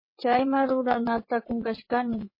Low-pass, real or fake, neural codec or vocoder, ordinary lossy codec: 5.4 kHz; fake; vocoder, 22.05 kHz, 80 mel bands, WaveNeXt; MP3, 24 kbps